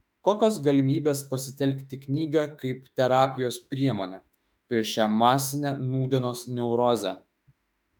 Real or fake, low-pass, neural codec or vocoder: fake; 19.8 kHz; autoencoder, 48 kHz, 32 numbers a frame, DAC-VAE, trained on Japanese speech